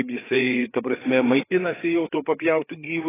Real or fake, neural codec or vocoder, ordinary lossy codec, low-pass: fake; codec, 16 kHz, 4 kbps, FreqCodec, larger model; AAC, 16 kbps; 3.6 kHz